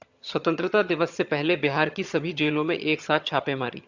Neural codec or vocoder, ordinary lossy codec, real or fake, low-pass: vocoder, 22.05 kHz, 80 mel bands, HiFi-GAN; Opus, 64 kbps; fake; 7.2 kHz